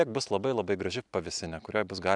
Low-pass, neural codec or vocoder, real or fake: 10.8 kHz; none; real